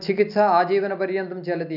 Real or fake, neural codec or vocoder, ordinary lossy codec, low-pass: real; none; none; 5.4 kHz